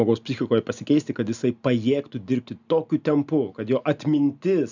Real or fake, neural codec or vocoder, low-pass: real; none; 7.2 kHz